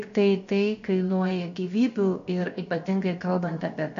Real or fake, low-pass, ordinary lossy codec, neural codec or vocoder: fake; 7.2 kHz; MP3, 48 kbps; codec, 16 kHz, about 1 kbps, DyCAST, with the encoder's durations